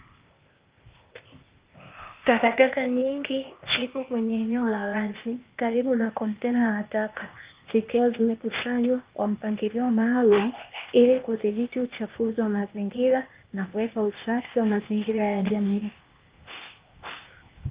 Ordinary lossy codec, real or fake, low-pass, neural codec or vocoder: Opus, 32 kbps; fake; 3.6 kHz; codec, 16 kHz, 0.8 kbps, ZipCodec